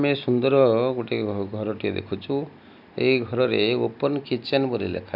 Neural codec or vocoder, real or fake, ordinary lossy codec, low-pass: none; real; none; 5.4 kHz